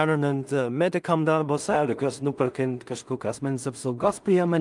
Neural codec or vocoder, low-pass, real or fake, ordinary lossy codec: codec, 16 kHz in and 24 kHz out, 0.4 kbps, LongCat-Audio-Codec, two codebook decoder; 10.8 kHz; fake; Opus, 32 kbps